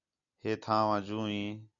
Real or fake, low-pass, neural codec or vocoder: real; 7.2 kHz; none